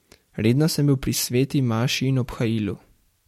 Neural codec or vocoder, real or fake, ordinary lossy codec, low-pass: none; real; MP3, 64 kbps; 19.8 kHz